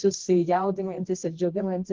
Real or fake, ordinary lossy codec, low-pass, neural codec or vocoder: fake; Opus, 16 kbps; 7.2 kHz; codec, 24 kHz, 0.9 kbps, WavTokenizer, medium music audio release